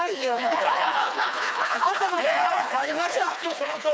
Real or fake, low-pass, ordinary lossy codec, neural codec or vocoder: fake; none; none; codec, 16 kHz, 2 kbps, FreqCodec, smaller model